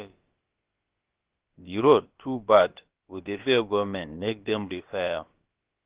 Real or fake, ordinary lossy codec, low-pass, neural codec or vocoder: fake; Opus, 16 kbps; 3.6 kHz; codec, 16 kHz, about 1 kbps, DyCAST, with the encoder's durations